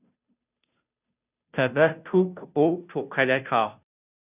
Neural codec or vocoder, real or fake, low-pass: codec, 16 kHz, 0.5 kbps, FunCodec, trained on Chinese and English, 25 frames a second; fake; 3.6 kHz